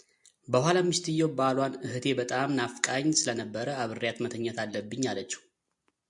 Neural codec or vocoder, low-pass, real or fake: none; 10.8 kHz; real